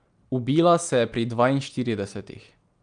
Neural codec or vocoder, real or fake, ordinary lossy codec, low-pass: none; real; Opus, 32 kbps; 9.9 kHz